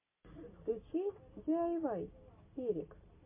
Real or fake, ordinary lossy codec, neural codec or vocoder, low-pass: real; MP3, 24 kbps; none; 3.6 kHz